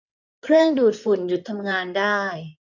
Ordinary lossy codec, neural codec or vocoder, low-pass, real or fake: none; codec, 16 kHz in and 24 kHz out, 2.2 kbps, FireRedTTS-2 codec; 7.2 kHz; fake